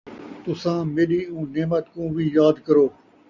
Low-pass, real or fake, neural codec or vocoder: 7.2 kHz; real; none